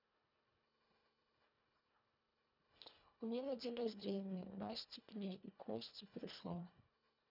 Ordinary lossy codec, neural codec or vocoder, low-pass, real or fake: none; codec, 24 kHz, 1.5 kbps, HILCodec; 5.4 kHz; fake